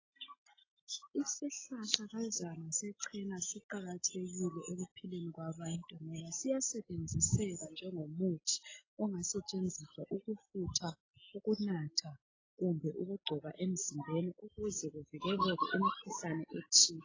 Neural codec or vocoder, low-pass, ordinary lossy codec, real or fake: none; 7.2 kHz; AAC, 32 kbps; real